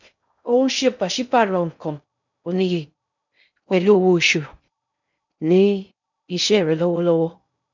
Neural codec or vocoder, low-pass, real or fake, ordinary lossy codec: codec, 16 kHz in and 24 kHz out, 0.6 kbps, FocalCodec, streaming, 2048 codes; 7.2 kHz; fake; none